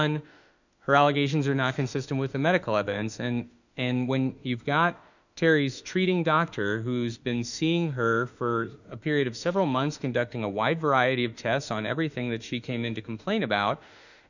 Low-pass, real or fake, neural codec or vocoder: 7.2 kHz; fake; autoencoder, 48 kHz, 32 numbers a frame, DAC-VAE, trained on Japanese speech